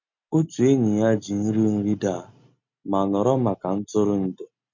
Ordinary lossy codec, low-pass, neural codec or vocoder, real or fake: MP3, 48 kbps; 7.2 kHz; none; real